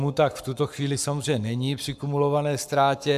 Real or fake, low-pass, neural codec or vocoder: fake; 14.4 kHz; codec, 44.1 kHz, 7.8 kbps, Pupu-Codec